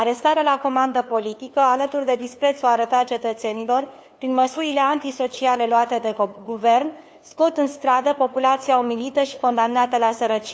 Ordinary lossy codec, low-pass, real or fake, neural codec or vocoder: none; none; fake; codec, 16 kHz, 2 kbps, FunCodec, trained on LibriTTS, 25 frames a second